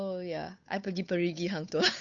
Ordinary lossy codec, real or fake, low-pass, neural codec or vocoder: none; fake; 7.2 kHz; codec, 16 kHz, 8 kbps, FunCodec, trained on Chinese and English, 25 frames a second